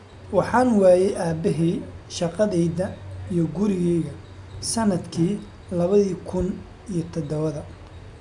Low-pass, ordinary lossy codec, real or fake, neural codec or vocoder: 10.8 kHz; none; real; none